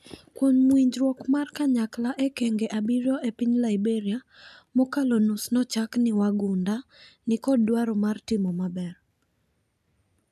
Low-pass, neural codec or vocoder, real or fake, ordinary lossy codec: 14.4 kHz; none; real; none